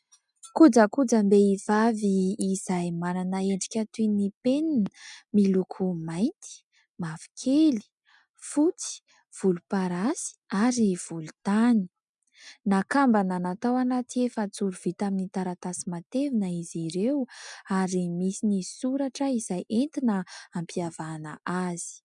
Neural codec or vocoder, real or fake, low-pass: none; real; 10.8 kHz